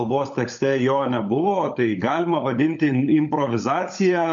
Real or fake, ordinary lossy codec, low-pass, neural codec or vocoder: fake; MP3, 64 kbps; 7.2 kHz; codec, 16 kHz, 16 kbps, FunCodec, trained on LibriTTS, 50 frames a second